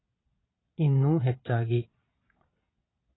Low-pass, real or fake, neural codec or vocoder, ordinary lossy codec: 7.2 kHz; fake; vocoder, 22.05 kHz, 80 mel bands, Vocos; AAC, 16 kbps